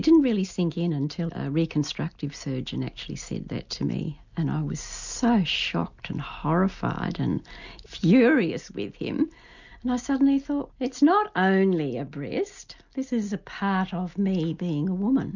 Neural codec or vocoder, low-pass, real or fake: none; 7.2 kHz; real